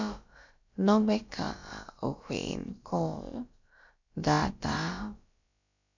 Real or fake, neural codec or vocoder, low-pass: fake; codec, 16 kHz, about 1 kbps, DyCAST, with the encoder's durations; 7.2 kHz